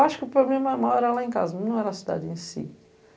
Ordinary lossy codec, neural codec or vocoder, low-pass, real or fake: none; none; none; real